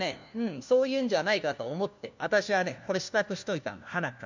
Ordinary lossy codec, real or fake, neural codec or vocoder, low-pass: none; fake; codec, 16 kHz, 1 kbps, FunCodec, trained on LibriTTS, 50 frames a second; 7.2 kHz